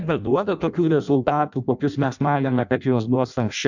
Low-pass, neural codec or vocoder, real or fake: 7.2 kHz; codec, 16 kHz in and 24 kHz out, 0.6 kbps, FireRedTTS-2 codec; fake